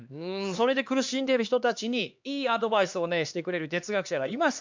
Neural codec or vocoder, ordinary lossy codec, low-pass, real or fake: codec, 16 kHz, 2 kbps, X-Codec, HuBERT features, trained on LibriSpeech; MP3, 64 kbps; 7.2 kHz; fake